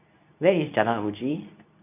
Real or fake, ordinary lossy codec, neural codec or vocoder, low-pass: fake; none; codec, 24 kHz, 0.9 kbps, WavTokenizer, medium speech release version 2; 3.6 kHz